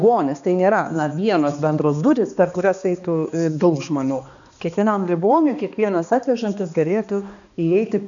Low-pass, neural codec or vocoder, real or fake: 7.2 kHz; codec, 16 kHz, 2 kbps, X-Codec, HuBERT features, trained on balanced general audio; fake